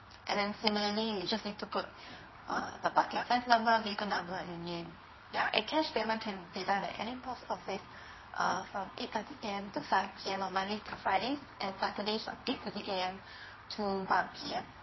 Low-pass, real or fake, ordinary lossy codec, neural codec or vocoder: 7.2 kHz; fake; MP3, 24 kbps; codec, 24 kHz, 0.9 kbps, WavTokenizer, medium music audio release